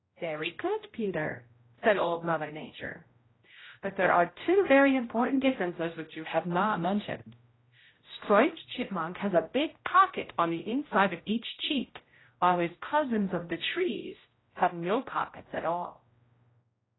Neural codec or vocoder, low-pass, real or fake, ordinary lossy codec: codec, 16 kHz, 0.5 kbps, X-Codec, HuBERT features, trained on general audio; 7.2 kHz; fake; AAC, 16 kbps